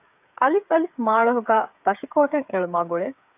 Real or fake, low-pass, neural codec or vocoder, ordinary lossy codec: fake; 3.6 kHz; codec, 16 kHz, 8 kbps, FreqCodec, smaller model; AAC, 32 kbps